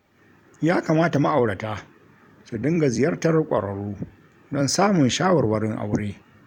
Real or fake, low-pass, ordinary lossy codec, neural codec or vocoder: fake; 19.8 kHz; none; vocoder, 44.1 kHz, 128 mel bands every 256 samples, BigVGAN v2